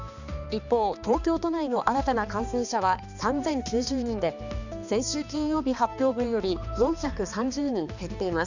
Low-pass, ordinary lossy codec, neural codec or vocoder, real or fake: 7.2 kHz; none; codec, 16 kHz, 2 kbps, X-Codec, HuBERT features, trained on balanced general audio; fake